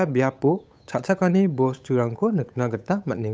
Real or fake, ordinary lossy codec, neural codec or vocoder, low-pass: fake; none; codec, 16 kHz, 8 kbps, FunCodec, trained on Chinese and English, 25 frames a second; none